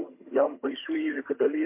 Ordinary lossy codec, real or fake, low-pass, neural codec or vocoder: MP3, 24 kbps; fake; 3.6 kHz; vocoder, 22.05 kHz, 80 mel bands, HiFi-GAN